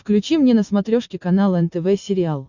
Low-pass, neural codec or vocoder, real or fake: 7.2 kHz; none; real